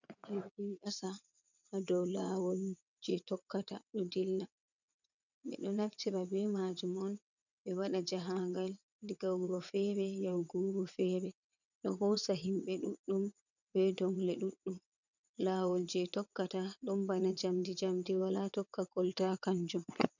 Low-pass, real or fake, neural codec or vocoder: 7.2 kHz; fake; vocoder, 22.05 kHz, 80 mel bands, WaveNeXt